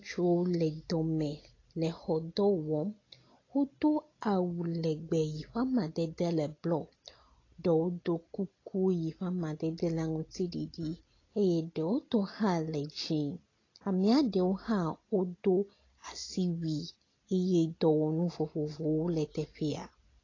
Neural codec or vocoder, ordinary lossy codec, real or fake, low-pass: none; AAC, 32 kbps; real; 7.2 kHz